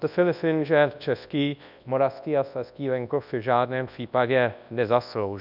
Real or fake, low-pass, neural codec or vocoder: fake; 5.4 kHz; codec, 24 kHz, 0.9 kbps, WavTokenizer, large speech release